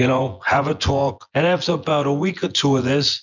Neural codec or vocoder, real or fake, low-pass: vocoder, 24 kHz, 100 mel bands, Vocos; fake; 7.2 kHz